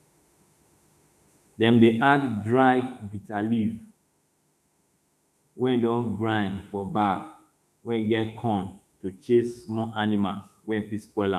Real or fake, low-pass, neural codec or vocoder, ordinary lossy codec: fake; 14.4 kHz; autoencoder, 48 kHz, 32 numbers a frame, DAC-VAE, trained on Japanese speech; none